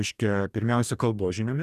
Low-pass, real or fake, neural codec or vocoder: 14.4 kHz; fake; codec, 44.1 kHz, 2.6 kbps, DAC